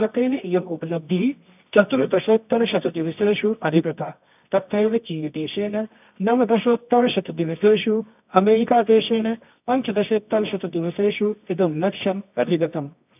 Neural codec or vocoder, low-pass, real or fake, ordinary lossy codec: codec, 24 kHz, 0.9 kbps, WavTokenizer, medium music audio release; 3.6 kHz; fake; none